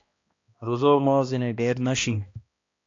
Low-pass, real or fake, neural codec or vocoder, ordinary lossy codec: 7.2 kHz; fake; codec, 16 kHz, 1 kbps, X-Codec, HuBERT features, trained on balanced general audio; AAC, 48 kbps